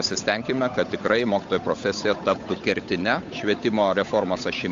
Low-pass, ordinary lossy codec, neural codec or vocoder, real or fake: 7.2 kHz; AAC, 64 kbps; codec, 16 kHz, 8 kbps, FunCodec, trained on Chinese and English, 25 frames a second; fake